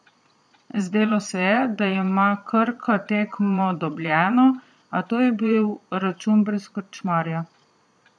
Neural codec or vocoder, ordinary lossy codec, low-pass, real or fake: vocoder, 22.05 kHz, 80 mel bands, Vocos; none; none; fake